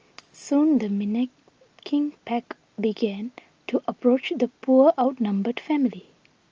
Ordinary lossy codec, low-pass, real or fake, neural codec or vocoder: Opus, 24 kbps; 7.2 kHz; real; none